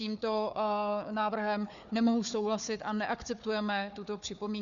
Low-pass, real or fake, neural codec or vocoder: 7.2 kHz; fake; codec, 16 kHz, 4 kbps, FunCodec, trained on Chinese and English, 50 frames a second